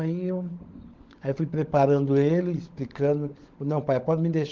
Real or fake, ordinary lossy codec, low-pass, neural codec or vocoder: fake; Opus, 16 kbps; 7.2 kHz; codec, 16 kHz, 16 kbps, FunCodec, trained on LibriTTS, 50 frames a second